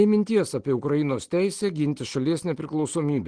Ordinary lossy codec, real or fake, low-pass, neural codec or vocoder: Opus, 16 kbps; real; 9.9 kHz; none